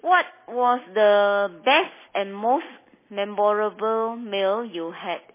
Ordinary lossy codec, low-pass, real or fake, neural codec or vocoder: MP3, 16 kbps; 3.6 kHz; real; none